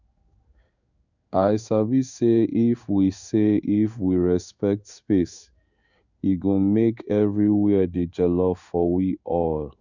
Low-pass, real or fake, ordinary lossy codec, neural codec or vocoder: 7.2 kHz; fake; none; codec, 16 kHz in and 24 kHz out, 1 kbps, XY-Tokenizer